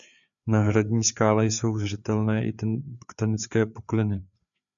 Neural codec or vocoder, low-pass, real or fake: codec, 16 kHz, 4 kbps, FreqCodec, larger model; 7.2 kHz; fake